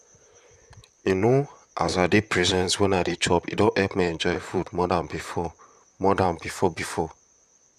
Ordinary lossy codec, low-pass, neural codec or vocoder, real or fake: Opus, 64 kbps; 14.4 kHz; vocoder, 44.1 kHz, 128 mel bands, Pupu-Vocoder; fake